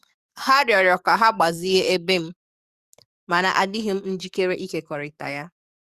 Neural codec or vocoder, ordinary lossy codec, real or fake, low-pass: codec, 44.1 kHz, 7.8 kbps, DAC; Opus, 64 kbps; fake; 14.4 kHz